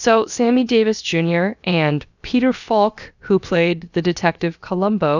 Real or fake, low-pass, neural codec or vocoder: fake; 7.2 kHz; codec, 16 kHz, about 1 kbps, DyCAST, with the encoder's durations